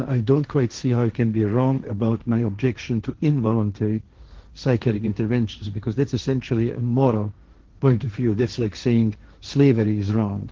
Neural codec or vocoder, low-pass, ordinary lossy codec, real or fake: codec, 16 kHz, 1.1 kbps, Voila-Tokenizer; 7.2 kHz; Opus, 16 kbps; fake